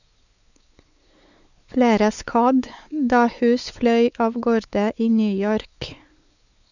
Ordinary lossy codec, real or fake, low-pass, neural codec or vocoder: none; fake; 7.2 kHz; codec, 16 kHz, 4 kbps, X-Codec, WavLM features, trained on Multilingual LibriSpeech